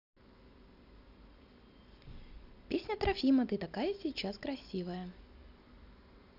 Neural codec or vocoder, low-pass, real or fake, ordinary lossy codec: none; 5.4 kHz; real; none